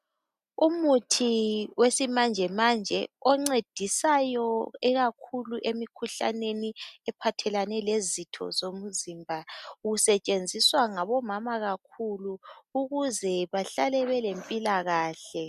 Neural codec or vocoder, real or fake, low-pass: none; real; 14.4 kHz